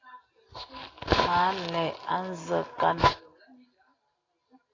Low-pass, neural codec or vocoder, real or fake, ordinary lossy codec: 7.2 kHz; none; real; AAC, 32 kbps